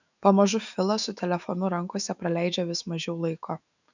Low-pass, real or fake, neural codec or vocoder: 7.2 kHz; fake; autoencoder, 48 kHz, 128 numbers a frame, DAC-VAE, trained on Japanese speech